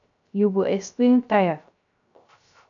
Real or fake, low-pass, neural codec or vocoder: fake; 7.2 kHz; codec, 16 kHz, 0.3 kbps, FocalCodec